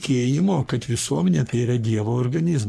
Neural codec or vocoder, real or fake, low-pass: codec, 44.1 kHz, 3.4 kbps, Pupu-Codec; fake; 14.4 kHz